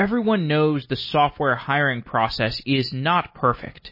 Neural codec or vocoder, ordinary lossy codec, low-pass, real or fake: none; MP3, 24 kbps; 5.4 kHz; real